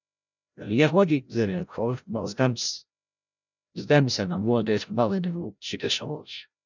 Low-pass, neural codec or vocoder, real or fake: 7.2 kHz; codec, 16 kHz, 0.5 kbps, FreqCodec, larger model; fake